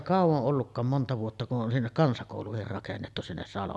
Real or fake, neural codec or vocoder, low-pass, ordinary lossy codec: real; none; none; none